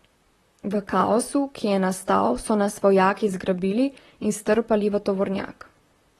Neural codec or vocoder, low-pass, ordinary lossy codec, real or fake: vocoder, 44.1 kHz, 128 mel bands, Pupu-Vocoder; 19.8 kHz; AAC, 32 kbps; fake